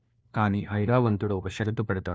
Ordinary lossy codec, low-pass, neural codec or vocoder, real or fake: none; none; codec, 16 kHz, 1 kbps, FunCodec, trained on LibriTTS, 50 frames a second; fake